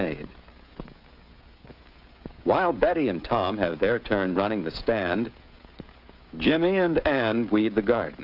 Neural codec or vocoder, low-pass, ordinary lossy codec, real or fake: codec, 16 kHz, 16 kbps, FreqCodec, smaller model; 5.4 kHz; AAC, 48 kbps; fake